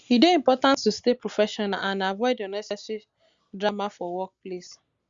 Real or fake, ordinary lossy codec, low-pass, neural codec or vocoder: real; Opus, 64 kbps; 7.2 kHz; none